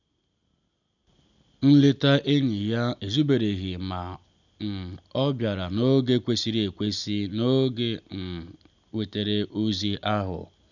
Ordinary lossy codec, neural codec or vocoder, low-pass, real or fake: none; none; 7.2 kHz; real